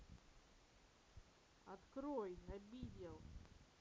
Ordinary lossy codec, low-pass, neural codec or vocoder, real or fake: none; none; none; real